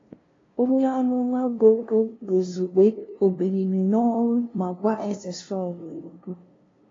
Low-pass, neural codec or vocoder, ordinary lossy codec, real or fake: 7.2 kHz; codec, 16 kHz, 0.5 kbps, FunCodec, trained on LibriTTS, 25 frames a second; AAC, 32 kbps; fake